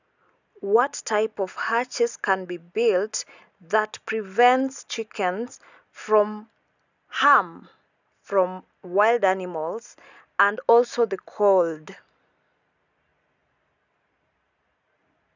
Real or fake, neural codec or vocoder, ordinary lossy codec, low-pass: real; none; none; 7.2 kHz